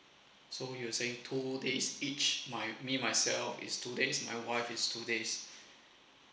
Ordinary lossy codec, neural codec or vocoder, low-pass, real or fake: none; none; none; real